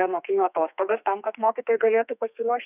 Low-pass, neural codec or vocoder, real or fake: 3.6 kHz; codec, 32 kHz, 1.9 kbps, SNAC; fake